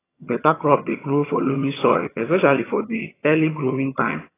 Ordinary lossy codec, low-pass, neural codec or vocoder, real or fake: AAC, 16 kbps; 3.6 kHz; vocoder, 22.05 kHz, 80 mel bands, HiFi-GAN; fake